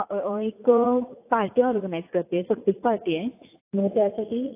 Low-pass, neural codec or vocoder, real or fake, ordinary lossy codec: 3.6 kHz; vocoder, 44.1 kHz, 80 mel bands, Vocos; fake; AAC, 24 kbps